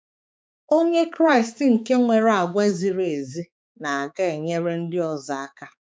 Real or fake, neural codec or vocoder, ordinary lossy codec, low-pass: fake; codec, 16 kHz, 4 kbps, X-Codec, HuBERT features, trained on balanced general audio; none; none